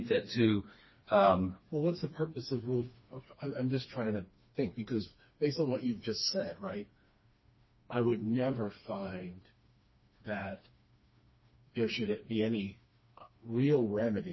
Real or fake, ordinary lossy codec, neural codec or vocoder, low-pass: fake; MP3, 24 kbps; codec, 16 kHz, 2 kbps, FreqCodec, smaller model; 7.2 kHz